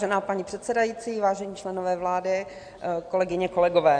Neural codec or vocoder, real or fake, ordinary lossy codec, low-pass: none; real; AAC, 64 kbps; 9.9 kHz